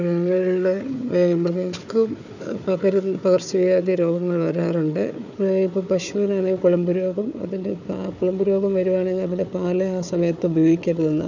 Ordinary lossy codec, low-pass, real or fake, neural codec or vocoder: none; 7.2 kHz; fake; codec, 16 kHz, 4 kbps, FunCodec, trained on Chinese and English, 50 frames a second